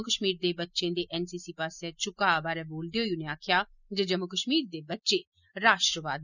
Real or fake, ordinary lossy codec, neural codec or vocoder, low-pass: real; none; none; 7.2 kHz